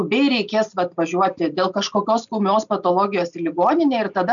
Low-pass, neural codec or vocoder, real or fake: 7.2 kHz; none; real